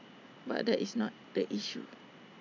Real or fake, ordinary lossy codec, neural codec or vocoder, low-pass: real; MP3, 64 kbps; none; 7.2 kHz